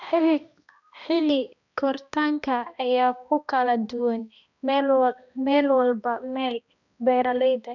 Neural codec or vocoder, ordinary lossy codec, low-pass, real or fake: codec, 16 kHz, 1 kbps, X-Codec, HuBERT features, trained on balanced general audio; none; 7.2 kHz; fake